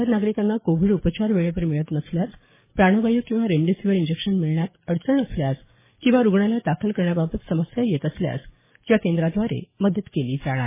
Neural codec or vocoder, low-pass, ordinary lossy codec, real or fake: codec, 44.1 kHz, 7.8 kbps, DAC; 3.6 kHz; MP3, 16 kbps; fake